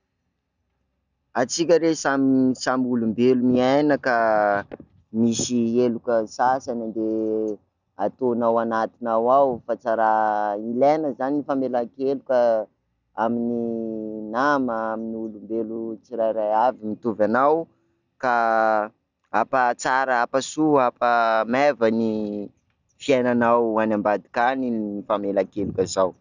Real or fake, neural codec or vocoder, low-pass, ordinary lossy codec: real; none; 7.2 kHz; none